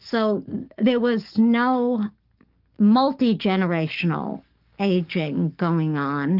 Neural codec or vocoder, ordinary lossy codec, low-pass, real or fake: codec, 44.1 kHz, 7.8 kbps, DAC; Opus, 24 kbps; 5.4 kHz; fake